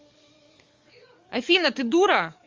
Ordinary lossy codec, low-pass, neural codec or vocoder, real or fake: Opus, 32 kbps; 7.2 kHz; codec, 44.1 kHz, 7.8 kbps, DAC; fake